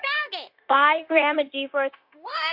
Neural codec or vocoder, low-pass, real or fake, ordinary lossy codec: codec, 16 kHz, 0.9 kbps, LongCat-Audio-Codec; 5.4 kHz; fake; MP3, 48 kbps